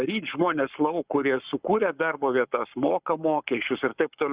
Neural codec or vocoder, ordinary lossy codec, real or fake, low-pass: codec, 44.1 kHz, 7.8 kbps, Pupu-Codec; Opus, 64 kbps; fake; 3.6 kHz